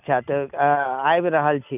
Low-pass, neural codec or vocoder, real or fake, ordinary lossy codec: 3.6 kHz; none; real; none